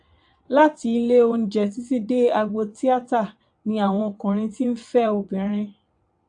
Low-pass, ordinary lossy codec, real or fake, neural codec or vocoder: 9.9 kHz; AAC, 64 kbps; fake; vocoder, 22.05 kHz, 80 mel bands, WaveNeXt